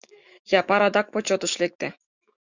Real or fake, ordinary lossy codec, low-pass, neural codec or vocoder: fake; Opus, 64 kbps; 7.2 kHz; autoencoder, 48 kHz, 128 numbers a frame, DAC-VAE, trained on Japanese speech